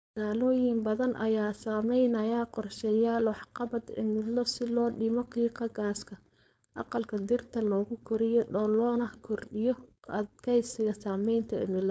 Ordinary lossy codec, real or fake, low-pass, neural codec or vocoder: none; fake; none; codec, 16 kHz, 4.8 kbps, FACodec